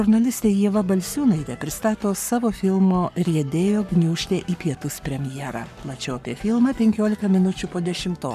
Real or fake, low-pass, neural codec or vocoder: fake; 14.4 kHz; codec, 44.1 kHz, 7.8 kbps, Pupu-Codec